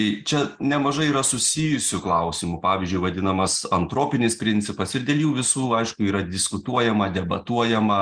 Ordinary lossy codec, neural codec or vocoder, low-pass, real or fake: Opus, 32 kbps; none; 9.9 kHz; real